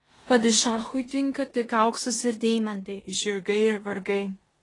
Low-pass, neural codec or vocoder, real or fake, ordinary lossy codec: 10.8 kHz; codec, 16 kHz in and 24 kHz out, 0.9 kbps, LongCat-Audio-Codec, four codebook decoder; fake; AAC, 32 kbps